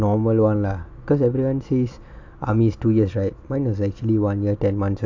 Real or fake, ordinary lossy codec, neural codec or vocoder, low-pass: real; AAC, 48 kbps; none; 7.2 kHz